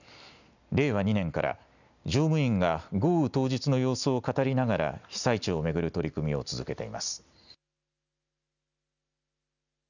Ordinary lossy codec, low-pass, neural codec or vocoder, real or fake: none; 7.2 kHz; none; real